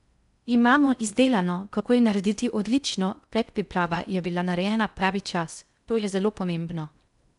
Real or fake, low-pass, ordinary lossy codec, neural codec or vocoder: fake; 10.8 kHz; none; codec, 16 kHz in and 24 kHz out, 0.6 kbps, FocalCodec, streaming, 4096 codes